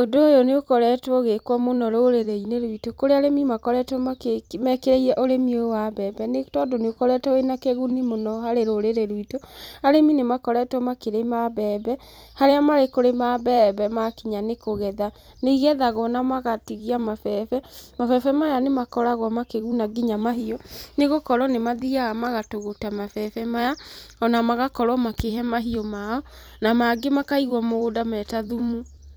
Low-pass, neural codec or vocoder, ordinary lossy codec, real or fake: none; vocoder, 44.1 kHz, 128 mel bands every 256 samples, BigVGAN v2; none; fake